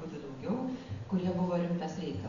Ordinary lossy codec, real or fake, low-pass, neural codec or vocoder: Opus, 64 kbps; real; 7.2 kHz; none